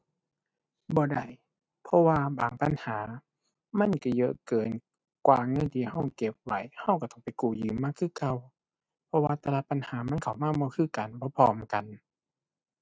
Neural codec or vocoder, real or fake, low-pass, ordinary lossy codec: none; real; none; none